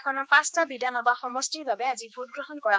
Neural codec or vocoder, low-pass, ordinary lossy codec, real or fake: codec, 16 kHz, 2 kbps, X-Codec, HuBERT features, trained on general audio; none; none; fake